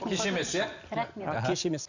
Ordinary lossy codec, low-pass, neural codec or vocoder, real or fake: none; 7.2 kHz; none; real